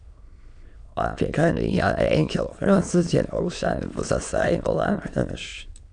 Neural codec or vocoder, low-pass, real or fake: autoencoder, 22.05 kHz, a latent of 192 numbers a frame, VITS, trained on many speakers; 9.9 kHz; fake